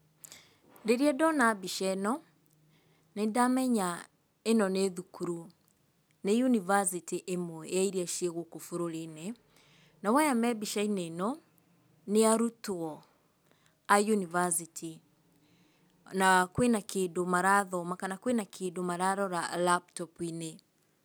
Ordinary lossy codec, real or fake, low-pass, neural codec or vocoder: none; real; none; none